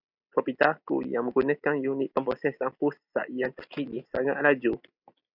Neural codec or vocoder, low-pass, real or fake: none; 5.4 kHz; real